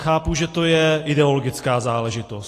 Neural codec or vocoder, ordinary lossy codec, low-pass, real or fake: none; AAC, 48 kbps; 14.4 kHz; real